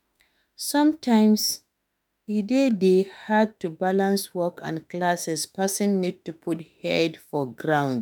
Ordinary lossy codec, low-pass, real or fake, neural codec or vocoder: none; none; fake; autoencoder, 48 kHz, 32 numbers a frame, DAC-VAE, trained on Japanese speech